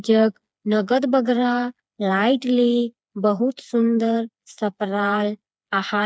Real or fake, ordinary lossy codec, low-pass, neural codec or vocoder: fake; none; none; codec, 16 kHz, 4 kbps, FreqCodec, smaller model